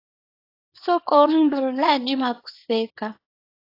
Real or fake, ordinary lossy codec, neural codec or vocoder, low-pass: fake; AAC, 32 kbps; codec, 24 kHz, 0.9 kbps, WavTokenizer, small release; 5.4 kHz